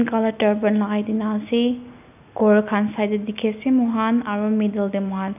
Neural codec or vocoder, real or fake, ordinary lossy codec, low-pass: none; real; none; 3.6 kHz